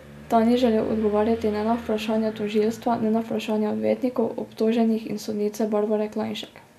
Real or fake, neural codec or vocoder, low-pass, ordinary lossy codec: real; none; 14.4 kHz; none